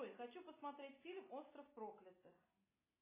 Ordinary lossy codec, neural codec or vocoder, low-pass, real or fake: MP3, 16 kbps; none; 3.6 kHz; real